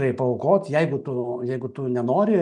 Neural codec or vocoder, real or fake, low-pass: none; real; 10.8 kHz